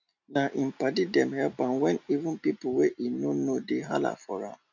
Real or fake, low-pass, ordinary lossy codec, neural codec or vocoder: real; 7.2 kHz; none; none